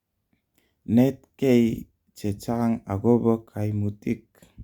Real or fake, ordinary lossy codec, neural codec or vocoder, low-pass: real; Opus, 64 kbps; none; 19.8 kHz